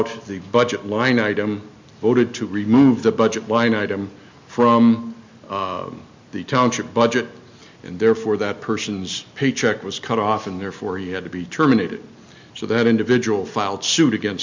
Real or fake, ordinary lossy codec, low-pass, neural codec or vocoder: real; MP3, 64 kbps; 7.2 kHz; none